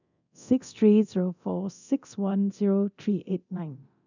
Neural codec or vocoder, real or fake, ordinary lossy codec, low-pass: codec, 24 kHz, 0.9 kbps, DualCodec; fake; none; 7.2 kHz